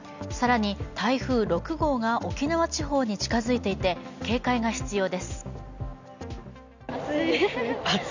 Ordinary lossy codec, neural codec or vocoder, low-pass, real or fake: none; none; 7.2 kHz; real